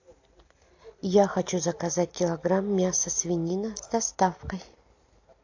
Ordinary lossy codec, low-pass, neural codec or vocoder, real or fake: AAC, 48 kbps; 7.2 kHz; none; real